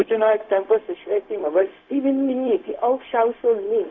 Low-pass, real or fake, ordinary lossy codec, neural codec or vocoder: 7.2 kHz; fake; Opus, 64 kbps; codec, 16 kHz, 0.4 kbps, LongCat-Audio-Codec